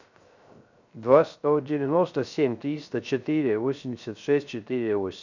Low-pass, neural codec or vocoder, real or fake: 7.2 kHz; codec, 16 kHz, 0.3 kbps, FocalCodec; fake